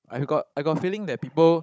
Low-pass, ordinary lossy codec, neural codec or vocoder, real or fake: none; none; codec, 16 kHz, 16 kbps, FunCodec, trained on Chinese and English, 50 frames a second; fake